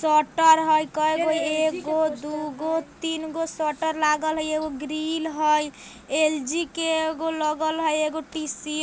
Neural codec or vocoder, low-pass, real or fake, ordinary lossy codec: none; none; real; none